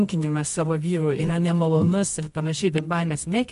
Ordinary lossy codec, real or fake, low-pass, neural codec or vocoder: MP3, 64 kbps; fake; 10.8 kHz; codec, 24 kHz, 0.9 kbps, WavTokenizer, medium music audio release